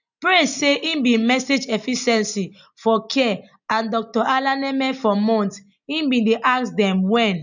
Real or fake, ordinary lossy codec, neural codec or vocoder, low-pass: real; none; none; 7.2 kHz